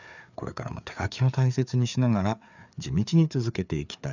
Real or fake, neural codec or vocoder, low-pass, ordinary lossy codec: fake; codec, 16 kHz, 4 kbps, FreqCodec, larger model; 7.2 kHz; none